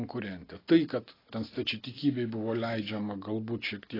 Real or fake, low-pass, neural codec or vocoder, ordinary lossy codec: real; 5.4 kHz; none; AAC, 24 kbps